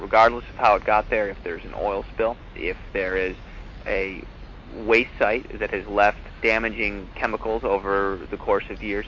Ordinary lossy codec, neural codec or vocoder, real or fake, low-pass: MP3, 48 kbps; none; real; 7.2 kHz